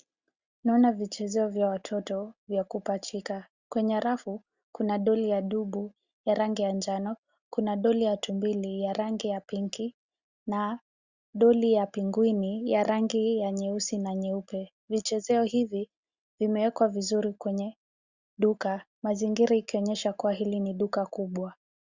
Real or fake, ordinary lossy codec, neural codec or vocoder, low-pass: real; Opus, 64 kbps; none; 7.2 kHz